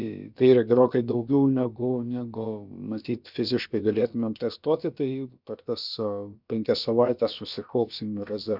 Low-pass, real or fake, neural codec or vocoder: 5.4 kHz; fake; codec, 16 kHz, about 1 kbps, DyCAST, with the encoder's durations